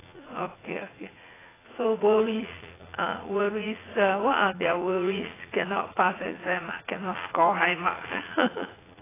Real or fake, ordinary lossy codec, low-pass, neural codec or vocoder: fake; AAC, 16 kbps; 3.6 kHz; vocoder, 44.1 kHz, 80 mel bands, Vocos